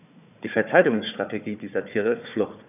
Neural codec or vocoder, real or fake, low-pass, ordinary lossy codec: codec, 16 kHz, 4 kbps, FunCodec, trained on Chinese and English, 50 frames a second; fake; 3.6 kHz; none